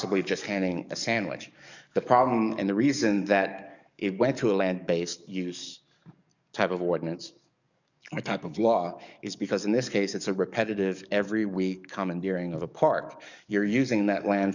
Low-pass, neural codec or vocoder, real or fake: 7.2 kHz; codec, 44.1 kHz, 7.8 kbps, DAC; fake